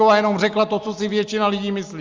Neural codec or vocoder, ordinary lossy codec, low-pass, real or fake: none; Opus, 32 kbps; 7.2 kHz; real